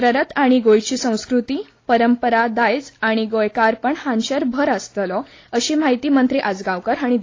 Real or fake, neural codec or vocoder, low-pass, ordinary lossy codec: real; none; 7.2 kHz; AAC, 32 kbps